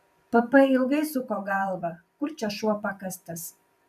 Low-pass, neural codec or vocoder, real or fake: 14.4 kHz; vocoder, 44.1 kHz, 128 mel bands every 512 samples, BigVGAN v2; fake